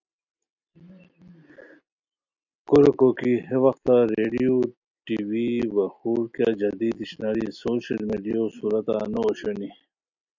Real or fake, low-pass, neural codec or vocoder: real; 7.2 kHz; none